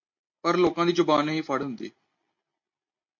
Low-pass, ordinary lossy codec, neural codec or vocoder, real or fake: 7.2 kHz; MP3, 48 kbps; none; real